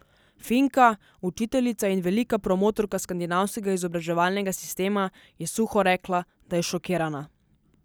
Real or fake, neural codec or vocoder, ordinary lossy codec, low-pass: real; none; none; none